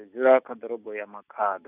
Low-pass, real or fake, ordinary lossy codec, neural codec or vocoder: 3.6 kHz; real; none; none